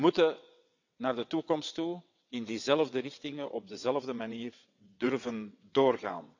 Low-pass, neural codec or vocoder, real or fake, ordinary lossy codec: 7.2 kHz; vocoder, 22.05 kHz, 80 mel bands, WaveNeXt; fake; none